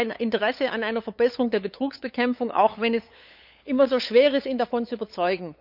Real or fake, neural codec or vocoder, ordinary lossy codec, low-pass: fake; codec, 16 kHz, 8 kbps, FunCodec, trained on LibriTTS, 25 frames a second; none; 5.4 kHz